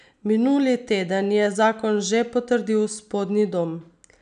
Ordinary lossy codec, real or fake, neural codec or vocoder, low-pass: none; real; none; 9.9 kHz